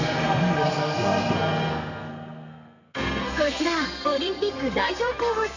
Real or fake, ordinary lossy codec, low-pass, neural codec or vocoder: fake; none; 7.2 kHz; codec, 32 kHz, 1.9 kbps, SNAC